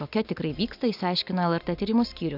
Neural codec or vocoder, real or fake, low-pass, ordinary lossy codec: none; real; 5.4 kHz; AAC, 48 kbps